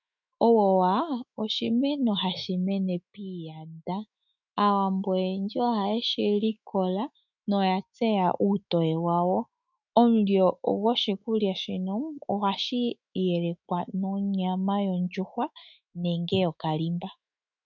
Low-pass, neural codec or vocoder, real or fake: 7.2 kHz; autoencoder, 48 kHz, 128 numbers a frame, DAC-VAE, trained on Japanese speech; fake